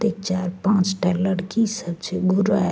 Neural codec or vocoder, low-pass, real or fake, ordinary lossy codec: none; none; real; none